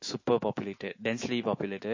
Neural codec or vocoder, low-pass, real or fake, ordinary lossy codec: autoencoder, 48 kHz, 128 numbers a frame, DAC-VAE, trained on Japanese speech; 7.2 kHz; fake; MP3, 32 kbps